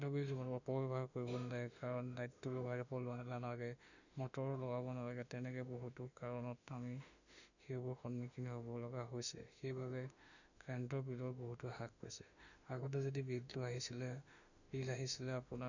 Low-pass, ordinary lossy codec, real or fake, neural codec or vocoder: 7.2 kHz; none; fake; autoencoder, 48 kHz, 32 numbers a frame, DAC-VAE, trained on Japanese speech